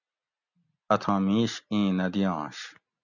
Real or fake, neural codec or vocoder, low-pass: real; none; 7.2 kHz